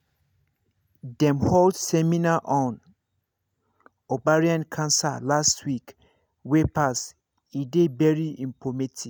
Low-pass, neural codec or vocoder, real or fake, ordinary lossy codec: none; none; real; none